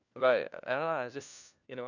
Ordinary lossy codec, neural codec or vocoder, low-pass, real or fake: none; codec, 16 kHz, 1 kbps, FunCodec, trained on LibriTTS, 50 frames a second; 7.2 kHz; fake